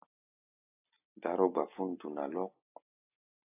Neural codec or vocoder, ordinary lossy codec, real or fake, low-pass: none; AAC, 24 kbps; real; 3.6 kHz